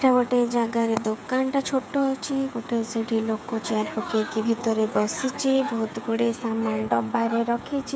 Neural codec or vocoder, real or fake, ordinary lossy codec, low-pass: codec, 16 kHz, 8 kbps, FreqCodec, smaller model; fake; none; none